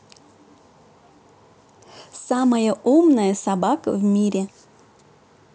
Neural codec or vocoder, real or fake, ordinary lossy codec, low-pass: none; real; none; none